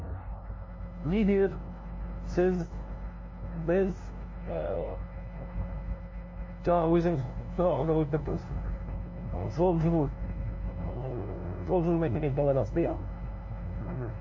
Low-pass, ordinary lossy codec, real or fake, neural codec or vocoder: 7.2 kHz; MP3, 32 kbps; fake; codec, 16 kHz, 0.5 kbps, FunCodec, trained on LibriTTS, 25 frames a second